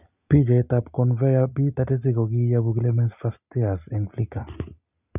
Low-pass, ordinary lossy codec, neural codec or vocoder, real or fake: 3.6 kHz; none; none; real